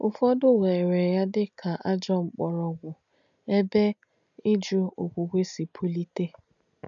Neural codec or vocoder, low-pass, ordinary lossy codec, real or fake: none; 7.2 kHz; none; real